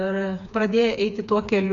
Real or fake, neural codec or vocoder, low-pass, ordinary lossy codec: fake; codec, 16 kHz, 4 kbps, FreqCodec, smaller model; 7.2 kHz; MP3, 96 kbps